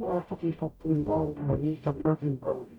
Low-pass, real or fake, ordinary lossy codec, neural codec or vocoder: 19.8 kHz; fake; none; codec, 44.1 kHz, 0.9 kbps, DAC